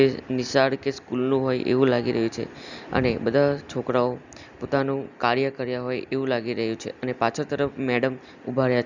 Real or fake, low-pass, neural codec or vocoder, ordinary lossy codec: real; 7.2 kHz; none; none